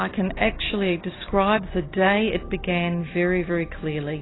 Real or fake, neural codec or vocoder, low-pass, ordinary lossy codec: real; none; 7.2 kHz; AAC, 16 kbps